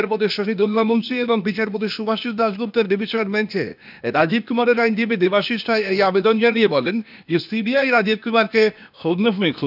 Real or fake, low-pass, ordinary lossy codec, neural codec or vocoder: fake; 5.4 kHz; none; codec, 16 kHz, 0.8 kbps, ZipCodec